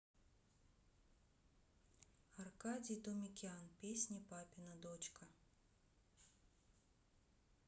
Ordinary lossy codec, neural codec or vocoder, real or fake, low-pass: none; none; real; none